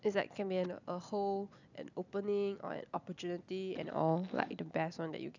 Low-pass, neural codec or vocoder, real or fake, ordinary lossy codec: 7.2 kHz; none; real; none